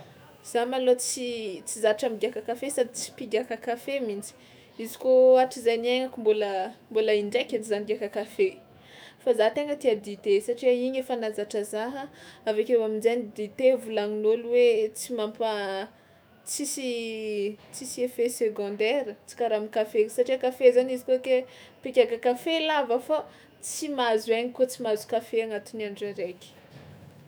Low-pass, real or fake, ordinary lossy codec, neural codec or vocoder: none; fake; none; autoencoder, 48 kHz, 128 numbers a frame, DAC-VAE, trained on Japanese speech